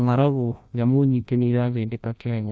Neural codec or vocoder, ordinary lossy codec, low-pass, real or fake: codec, 16 kHz, 1 kbps, FreqCodec, larger model; none; none; fake